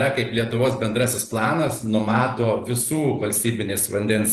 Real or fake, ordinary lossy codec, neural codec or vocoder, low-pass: fake; Opus, 24 kbps; vocoder, 48 kHz, 128 mel bands, Vocos; 14.4 kHz